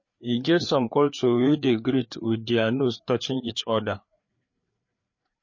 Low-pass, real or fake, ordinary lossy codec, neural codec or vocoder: 7.2 kHz; fake; MP3, 32 kbps; codec, 16 kHz, 4 kbps, FreqCodec, larger model